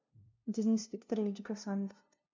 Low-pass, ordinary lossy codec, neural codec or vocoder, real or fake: 7.2 kHz; MP3, 64 kbps; codec, 16 kHz, 0.5 kbps, FunCodec, trained on LibriTTS, 25 frames a second; fake